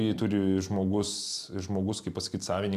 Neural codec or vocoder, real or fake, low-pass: none; real; 14.4 kHz